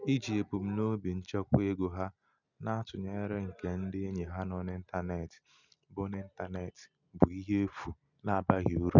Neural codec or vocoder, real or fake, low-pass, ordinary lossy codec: none; real; 7.2 kHz; none